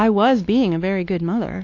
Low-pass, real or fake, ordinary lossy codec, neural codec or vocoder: 7.2 kHz; fake; AAC, 48 kbps; codec, 16 kHz, 2 kbps, X-Codec, WavLM features, trained on Multilingual LibriSpeech